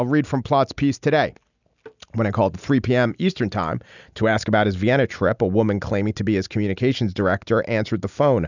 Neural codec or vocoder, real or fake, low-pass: none; real; 7.2 kHz